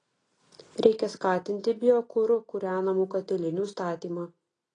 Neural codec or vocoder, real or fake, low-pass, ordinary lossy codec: none; real; 9.9 kHz; AAC, 32 kbps